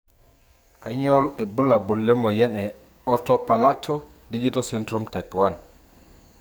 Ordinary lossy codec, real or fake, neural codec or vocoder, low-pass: none; fake; codec, 44.1 kHz, 2.6 kbps, SNAC; none